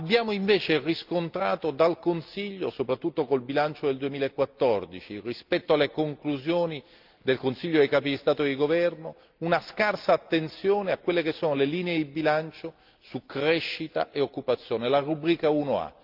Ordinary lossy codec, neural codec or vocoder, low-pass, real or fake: Opus, 24 kbps; none; 5.4 kHz; real